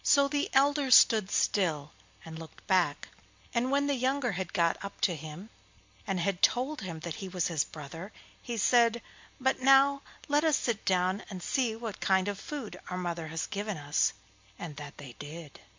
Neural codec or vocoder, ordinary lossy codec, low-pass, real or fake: none; MP3, 64 kbps; 7.2 kHz; real